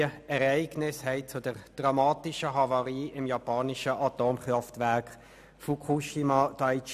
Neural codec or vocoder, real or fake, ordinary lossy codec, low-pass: none; real; none; 14.4 kHz